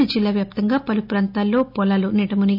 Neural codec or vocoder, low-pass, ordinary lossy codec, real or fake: none; 5.4 kHz; none; real